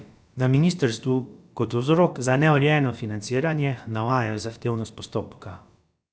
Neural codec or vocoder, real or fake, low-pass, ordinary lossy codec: codec, 16 kHz, about 1 kbps, DyCAST, with the encoder's durations; fake; none; none